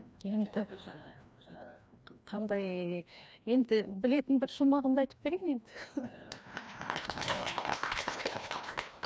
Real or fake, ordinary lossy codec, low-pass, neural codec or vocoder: fake; none; none; codec, 16 kHz, 1 kbps, FreqCodec, larger model